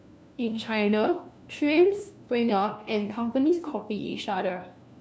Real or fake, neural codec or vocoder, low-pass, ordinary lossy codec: fake; codec, 16 kHz, 1 kbps, FunCodec, trained on LibriTTS, 50 frames a second; none; none